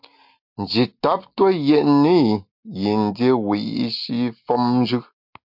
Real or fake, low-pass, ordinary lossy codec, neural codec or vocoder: real; 5.4 kHz; MP3, 48 kbps; none